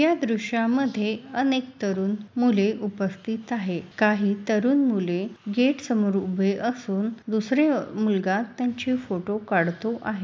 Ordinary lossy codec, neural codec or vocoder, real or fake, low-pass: none; none; real; none